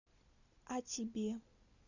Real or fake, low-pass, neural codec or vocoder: real; 7.2 kHz; none